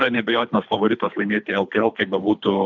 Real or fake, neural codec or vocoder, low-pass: fake; codec, 24 kHz, 3 kbps, HILCodec; 7.2 kHz